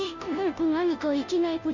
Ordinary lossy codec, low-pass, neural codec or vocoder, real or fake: Opus, 64 kbps; 7.2 kHz; codec, 16 kHz, 0.5 kbps, FunCodec, trained on Chinese and English, 25 frames a second; fake